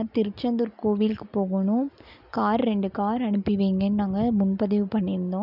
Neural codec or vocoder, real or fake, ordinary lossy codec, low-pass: none; real; none; 5.4 kHz